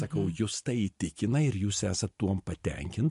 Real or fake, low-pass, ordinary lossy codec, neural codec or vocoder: real; 10.8 kHz; MP3, 64 kbps; none